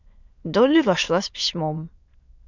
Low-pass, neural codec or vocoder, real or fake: 7.2 kHz; autoencoder, 22.05 kHz, a latent of 192 numbers a frame, VITS, trained on many speakers; fake